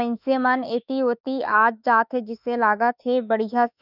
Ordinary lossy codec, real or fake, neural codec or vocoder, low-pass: none; fake; autoencoder, 48 kHz, 32 numbers a frame, DAC-VAE, trained on Japanese speech; 5.4 kHz